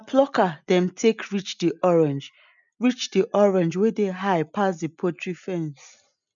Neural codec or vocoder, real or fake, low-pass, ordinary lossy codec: none; real; 7.2 kHz; none